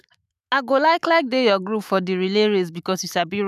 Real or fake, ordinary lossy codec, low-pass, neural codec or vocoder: fake; none; 14.4 kHz; autoencoder, 48 kHz, 128 numbers a frame, DAC-VAE, trained on Japanese speech